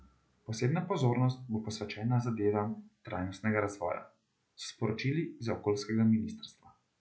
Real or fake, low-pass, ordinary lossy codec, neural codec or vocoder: real; none; none; none